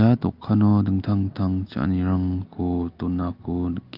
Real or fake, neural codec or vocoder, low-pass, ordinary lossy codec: real; none; 5.4 kHz; Opus, 24 kbps